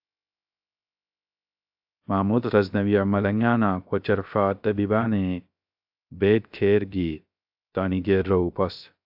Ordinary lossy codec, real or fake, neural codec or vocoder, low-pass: AAC, 48 kbps; fake; codec, 16 kHz, 0.3 kbps, FocalCodec; 5.4 kHz